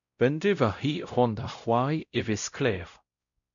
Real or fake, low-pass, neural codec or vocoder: fake; 7.2 kHz; codec, 16 kHz, 0.5 kbps, X-Codec, WavLM features, trained on Multilingual LibriSpeech